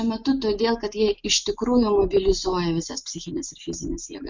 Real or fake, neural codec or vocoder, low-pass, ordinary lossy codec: real; none; 7.2 kHz; MP3, 64 kbps